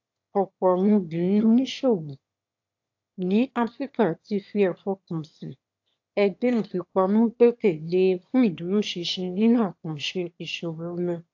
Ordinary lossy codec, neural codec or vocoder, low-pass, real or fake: AAC, 48 kbps; autoencoder, 22.05 kHz, a latent of 192 numbers a frame, VITS, trained on one speaker; 7.2 kHz; fake